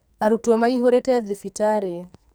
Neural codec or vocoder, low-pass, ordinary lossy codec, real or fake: codec, 44.1 kHz, 2.6 kbps, SNAC; none; none; fake